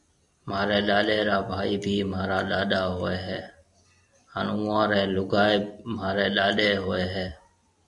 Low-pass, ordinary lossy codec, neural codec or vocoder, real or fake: 10.8 kHz; AAC, 64 kbps; none; real